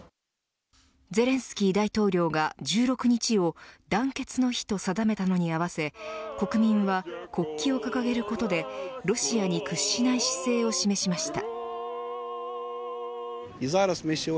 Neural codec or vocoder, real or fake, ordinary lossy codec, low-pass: none; real; none; none